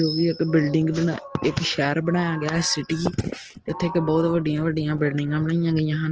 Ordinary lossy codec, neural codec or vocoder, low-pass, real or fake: Opus, 16 kbps; none; 7.2 kHz; real